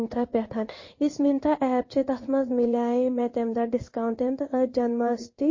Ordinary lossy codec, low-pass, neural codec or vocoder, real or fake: MP3, 32 kbps; 7.2 kHz; codec, 16 kHz in and 24 kHz out, 1 kbps, XY-Tokenizer; fake